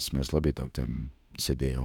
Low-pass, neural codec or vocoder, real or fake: 19.8 kHz; autoencoder, 48 kHz, 32 numbers a frame, DAC-VAE, trained on Japanese speech; fake